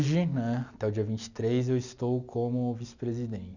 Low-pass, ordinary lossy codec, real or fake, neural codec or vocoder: 7.2 kHz; none; real; none